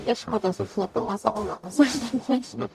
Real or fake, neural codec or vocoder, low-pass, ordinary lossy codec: fake; codec, 44.1 kHz, 0.9 kbps, DAC; 14.4 kHz; AAC, 96 kbps